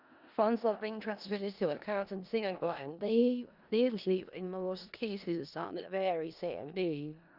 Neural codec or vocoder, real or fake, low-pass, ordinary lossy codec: codec, 16 kHz in and 24 kHz out, 0.4 kbps, LongCat-Audio-Codec, four codebook decoder; fake; 5.4 kHz; Opus, 64 kbps